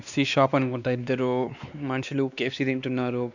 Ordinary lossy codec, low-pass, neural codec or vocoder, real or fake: none; 7.2 kHz; codec, 16 kHz, 2 kbps, X-Codec, WavLM features, trained on Multilingual LibriSpeech; fake